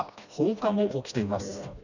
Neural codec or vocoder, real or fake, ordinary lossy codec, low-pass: codec, 16 kHz, 1 kbps, FreqCodec, smaller model; fake; none; 7.2 kHz